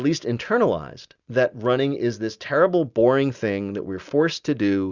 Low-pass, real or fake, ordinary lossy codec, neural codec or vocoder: 7.2 kHz; real; Opus, 64 kbps; none